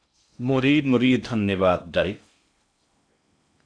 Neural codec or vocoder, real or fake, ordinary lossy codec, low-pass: codec, 16 kHz in and 24 kHz out, 0.6 kbps, FocalCodec, streaming, 2048 codes; fake; AAC, 64 kbps; 9.9 kHz